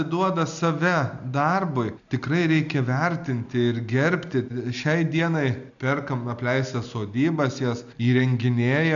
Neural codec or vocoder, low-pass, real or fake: none; 7.2 kHz; real